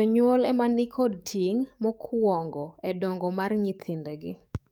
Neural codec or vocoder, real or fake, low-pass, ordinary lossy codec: codec, 44.1 kHz, 7.8 kbps, DAC; fake; 19.8 kHz; none